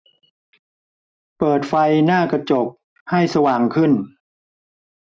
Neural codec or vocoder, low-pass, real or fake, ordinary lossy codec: none; none; real; none